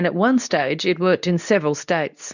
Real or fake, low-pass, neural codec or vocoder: fake; 7.2 kHz; codec, 24 kHz, 0.9 kbps, WavTokenizer, medium speech release version 2